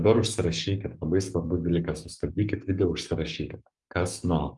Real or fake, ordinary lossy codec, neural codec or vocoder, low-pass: fake; Opus, 32 kbps; codec, 44.1 kHz, 7.8 kbps, Pupu-Codec; 10.8 kHz